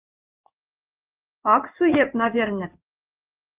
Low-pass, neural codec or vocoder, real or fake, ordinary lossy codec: 3.6 kHz; codec, 16 kHz in and 24 kHz out, 1 kbps, XY-Tokenizer; fake; Opus, 32 kbps